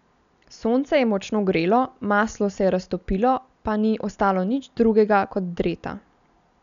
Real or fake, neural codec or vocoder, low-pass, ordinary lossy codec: real; none; 7.2 kHz; none